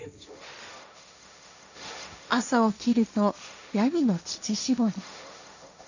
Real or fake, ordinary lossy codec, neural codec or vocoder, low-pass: fake; none; codec, 16 kHz, 1.1 kbps, Voila-Tokenizer; 7.2 kHz